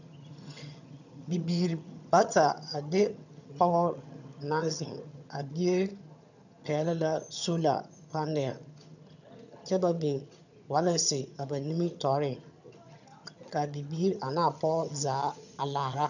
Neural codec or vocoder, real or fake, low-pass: vocoder, 22.05 kHz, 80 mel bands, HiFi-GAN; fake; 7.2 kHz